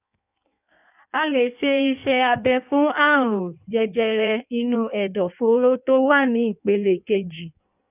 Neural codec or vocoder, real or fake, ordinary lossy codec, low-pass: codec, 16 kHz in and 24 kHz out, 1.1 kbps, FireRedTTS-2 codec; fake; none; 3.6 kHz